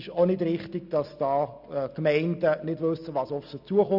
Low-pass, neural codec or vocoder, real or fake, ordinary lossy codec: 5.4 kHz; none; real; none